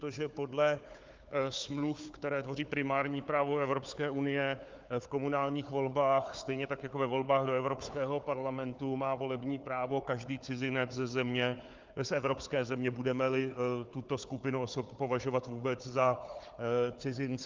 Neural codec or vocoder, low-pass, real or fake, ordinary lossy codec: codec, 16 kHz, 4 kbps, FunCodec, trained on Chinese and English, 50 frames a second; 7.2 kHz; fake; Opus, 32 kbps